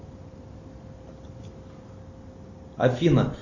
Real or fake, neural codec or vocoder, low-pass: real; none; 7.2 kHz